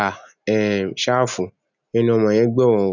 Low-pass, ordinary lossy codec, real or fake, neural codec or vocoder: 7.2 kHz; none; real; none